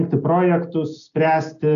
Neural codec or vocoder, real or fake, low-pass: none; real; 7.2 kHz